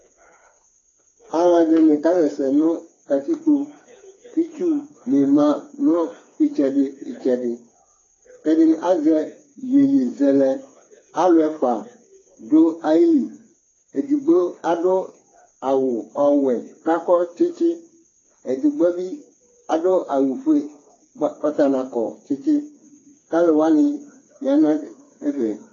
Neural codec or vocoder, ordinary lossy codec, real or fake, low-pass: codec, 16 kHz, 4 kbps, FreqCodec, smaller model; AAC, 32 kbps; fake; 7.2 kHz